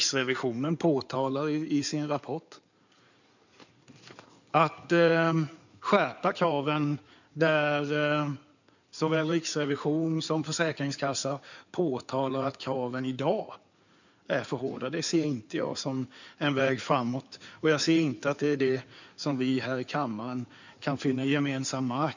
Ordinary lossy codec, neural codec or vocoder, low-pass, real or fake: none; codec, 16 kHz in and 24 kHz out, 2.2 kbps, FireRedTTS-2 codec; 7.2 kHz; fake